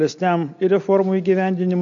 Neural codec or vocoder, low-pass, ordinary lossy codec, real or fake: none; 7.2 kHz; MP3, 64 kbps; real